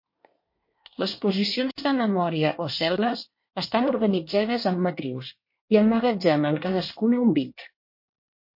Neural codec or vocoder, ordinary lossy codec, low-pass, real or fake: codec, 24 kHz, 1 kbps, SNAC; MP3, 32 kbps; 5.4 kHz; fake